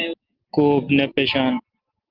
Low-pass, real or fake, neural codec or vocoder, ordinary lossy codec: 5.4 kHz; real; none; Opus, 16 kbps